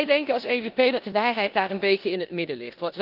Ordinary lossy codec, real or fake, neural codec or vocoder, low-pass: Opus, 32 kbps; fake; codec, 16 kHz in and 24 kHz out, 0.9 kbps, LongCat-Audio-Codec, four codebook decoder; 5.4 kHz